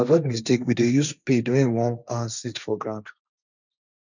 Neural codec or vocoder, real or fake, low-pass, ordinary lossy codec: codec, 16 kHz, 1.1 kbps, Voila-Tokenizer; fake; 7.2 kHz; none